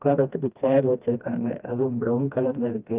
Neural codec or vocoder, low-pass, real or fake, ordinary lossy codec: codec, 16 kHz, 1 kbps, FreqCodec, smaller model; 3.6 kHz; fake; Opus, 32 kbps